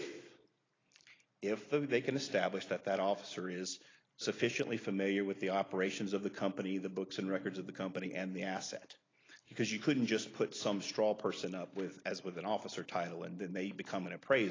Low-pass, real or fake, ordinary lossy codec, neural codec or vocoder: 7.2 kHz; real; AAC, 32 kbps; none